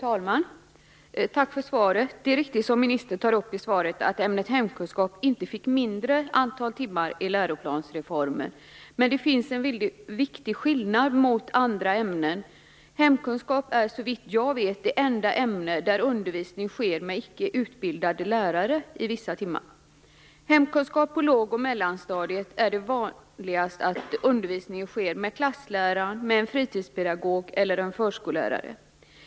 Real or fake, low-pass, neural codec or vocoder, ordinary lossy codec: real; none; none; none